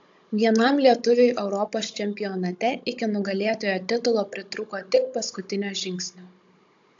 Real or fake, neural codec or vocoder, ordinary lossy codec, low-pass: fake; codec, 16 kHz, 16 kbps, FunCodec, trained on Chinese and English, 50 frames a second; AAC, 64 kbps; 7.2 kHz